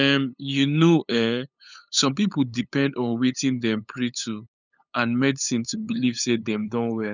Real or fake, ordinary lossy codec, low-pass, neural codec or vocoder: fake; none; 7.2 kHz; codec, 16 kHz, 8 kbps, FunCodec, trained on LibriTTS, 25 frames a second